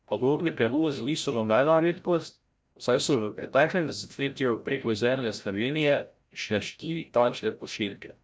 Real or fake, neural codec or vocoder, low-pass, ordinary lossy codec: fake; codec, 16 kHz, 0.5 kbps, FreqCodec, larger model; none; none